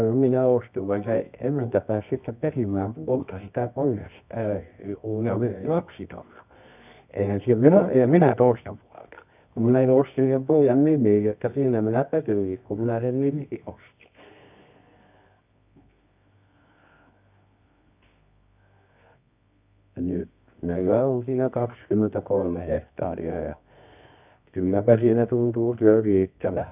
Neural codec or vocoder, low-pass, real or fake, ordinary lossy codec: codec, 24 kHz, 0.9 kbps, WavTokenizer, medium music audio release; 3.6 kHz; fake; none